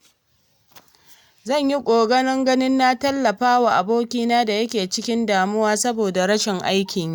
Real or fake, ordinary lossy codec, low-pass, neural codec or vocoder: real; none; none; none